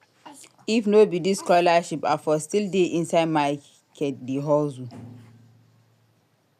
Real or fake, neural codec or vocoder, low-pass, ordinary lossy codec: real; none; 14.4 kHz; none